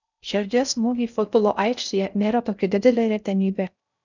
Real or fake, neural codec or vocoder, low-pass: fake; codec, 16 kHz in and 24 kHz out, 0.6 kbps, FocalCodec, streaming, 4096 codes; 7.2 kHz